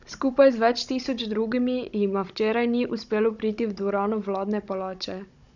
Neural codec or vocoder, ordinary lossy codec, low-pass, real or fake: codec, 16 kHz, 16 kbps, FunCodec, trained on Chinese and English, 50 frames a second; none; 7.2 kHz; fake